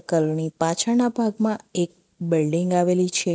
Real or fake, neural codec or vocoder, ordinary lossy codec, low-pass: real; none; none; none